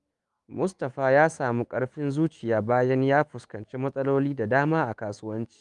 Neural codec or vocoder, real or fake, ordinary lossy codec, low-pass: autoencoder, 48 kHz, 128 numbers a frame, DAC-VAE, trained on Japanese speech; fake; Opus, 32 kbps; 10.8 kHz